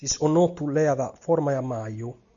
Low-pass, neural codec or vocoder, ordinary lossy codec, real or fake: 7.2 kHz; none; MP3, 64 kbps; real